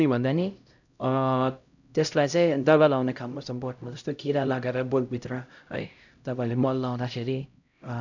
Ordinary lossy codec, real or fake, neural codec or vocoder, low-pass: none; fake; codec, 16 kHz, 0.5 kbps, X-Codec, HuBERT features, trained on LibriSpeech; 7.2 kHz